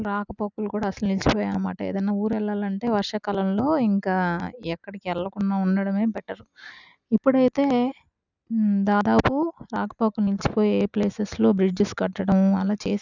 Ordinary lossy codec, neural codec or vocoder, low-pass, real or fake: none; none; 7.2 kHz; real